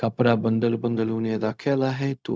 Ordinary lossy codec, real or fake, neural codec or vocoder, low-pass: none; fake; codec, 16 kHz, 0.4 kbps, LongCat-Audio-Codec; none